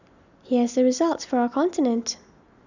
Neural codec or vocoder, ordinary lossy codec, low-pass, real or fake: none; none; 7.2 kHz; real